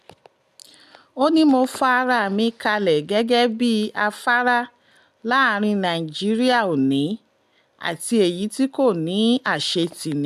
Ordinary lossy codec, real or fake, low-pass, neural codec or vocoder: none; real; 14.4 kHz; none